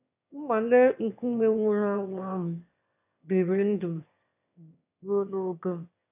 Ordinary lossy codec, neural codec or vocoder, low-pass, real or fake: none; autoencoder, 22.05 kHz, a latent of 192 numbers a frame, VITS, trained on one speaker; 3.6 kHz; fake